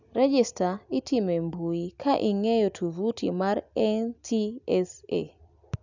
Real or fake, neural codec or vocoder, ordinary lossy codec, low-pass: real; none; none; 7.2 kHz